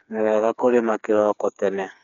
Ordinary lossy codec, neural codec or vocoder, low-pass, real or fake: none; codec, 16 kHz, 4 kbps, FreqCodec, smaller model; 7.2 kHz; fake